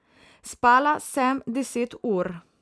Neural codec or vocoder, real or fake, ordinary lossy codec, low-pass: none; real; none; none